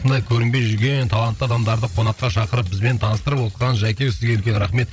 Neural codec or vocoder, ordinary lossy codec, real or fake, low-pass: codec, 16 kHz, 16 kbps, FreqCodec, larger model; none; fake; none